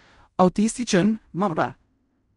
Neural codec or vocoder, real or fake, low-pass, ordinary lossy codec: codec, 16 kHz in and 24 kHz out, 0.4 kbps, LongCat-Audio-Codec, fine tuned four codebook decoder; fake; 10.8 kHz; none